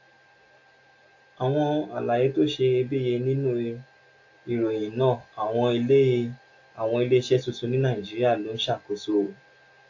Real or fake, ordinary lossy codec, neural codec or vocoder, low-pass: real; AAC, 48 kbps; none; 7.2 kHz